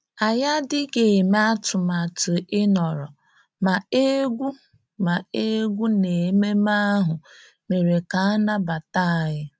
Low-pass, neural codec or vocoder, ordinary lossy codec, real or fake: none; none; none; real